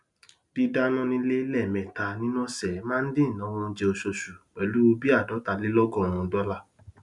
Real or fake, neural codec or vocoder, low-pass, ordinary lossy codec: real; none; 10.8 kHz; none